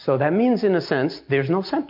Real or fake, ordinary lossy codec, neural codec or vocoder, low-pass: real; MP3, 48 kbps; none; 5.4 kHz